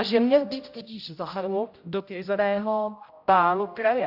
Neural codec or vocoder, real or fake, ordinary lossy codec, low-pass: codec, 16 kHz, 0.5 kbps, X-Codec, HuBERT features, trained on general audio; fake; AAC, 48 kbps; 5.4 kHz